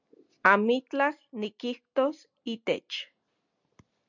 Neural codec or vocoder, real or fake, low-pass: none; real; 7.2 kHz